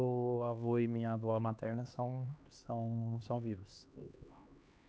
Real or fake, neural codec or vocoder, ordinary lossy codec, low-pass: fake; codec, 16 kHz, 2 kbps, X-Codec, HuBERT features, trained on LibriSpeech; none; none